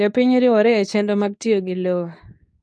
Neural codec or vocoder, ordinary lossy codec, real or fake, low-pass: codec, 24 kHz, 0.9 kbps, WavTokenizer, medium speech release version 2; none; fake; none